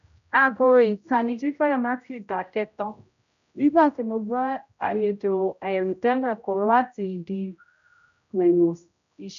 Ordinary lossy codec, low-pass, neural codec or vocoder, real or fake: none; 7.2 kHz; codec, 16 kHz, 0.5 kbps, X-Codec, HuBERT features, trained on general audio; fake